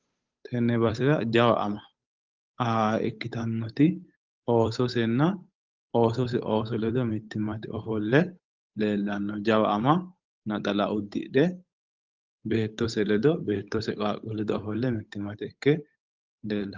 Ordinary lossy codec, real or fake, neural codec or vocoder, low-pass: Opus, 32 kbps; fake; codec, 16 kHz, 8 kbps, FunCodec, trained on Chinese and English, 25 frames a second; 7.2 kHz